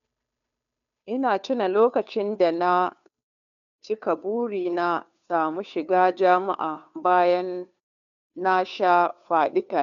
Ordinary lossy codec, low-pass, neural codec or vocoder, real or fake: none; 7.2 kHz; codec, 16 kHz, 2 kbps, FunCodec, trained on Chinese and English, 25 frames a second; fake